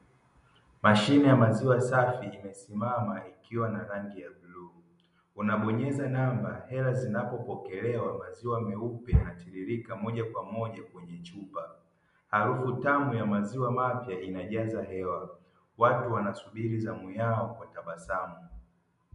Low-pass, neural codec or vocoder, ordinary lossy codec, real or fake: 10.8 kHz; none; MP3, 64 kbps; real